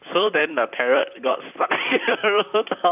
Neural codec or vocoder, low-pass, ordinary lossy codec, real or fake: vocoder, 44.1 kHz, 128 mel bands, Pupu-Vocoder; 3.6 kHz; none; fake